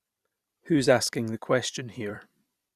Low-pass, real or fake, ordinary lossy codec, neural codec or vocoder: 14.4 kHz; fake; none; vocoder, 44.1 kHz, 128 mel bands every 256 samples, BigVGAN v2